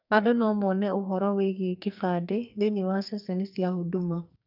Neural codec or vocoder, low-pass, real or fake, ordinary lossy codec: codec, 44.1 kHz, 2.6 kbps, SNAC; 5.4 kHz; fake; none